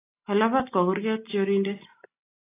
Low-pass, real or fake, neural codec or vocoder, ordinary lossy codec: 3.6 kHz; real; none; AAC, 24 kbps